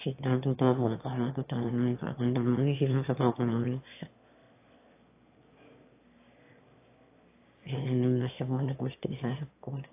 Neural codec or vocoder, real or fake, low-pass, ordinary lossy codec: autoencoder, 22.05 kHz, a latent of 192 numbers a frame, VITS, trained on one speaker; fake; 3.6 kHz; AAC, 24 kbps